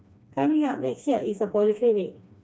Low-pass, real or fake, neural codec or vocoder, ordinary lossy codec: none; fake; codec, 16 kHz, 2 kbps, FreqCodec, smaller model; none